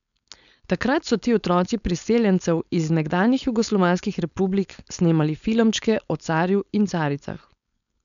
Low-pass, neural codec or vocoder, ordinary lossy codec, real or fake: 7.2 kHz; codec, 16 kHz, 4.8 kbps, FACodec; none; fake